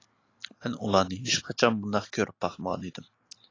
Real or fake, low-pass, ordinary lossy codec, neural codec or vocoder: real; 7.2 kHz; AAC, 32 kbps; none